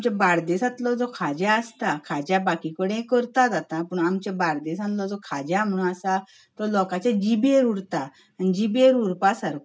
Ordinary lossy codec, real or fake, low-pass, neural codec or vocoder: none; real; none; none